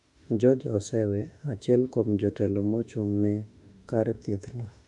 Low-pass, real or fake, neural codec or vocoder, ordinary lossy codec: 10.8 kHz; fake; autoencoder, 48 kHz, 32 numbers a frame, DAC-VAE, trained on Japanese speech; none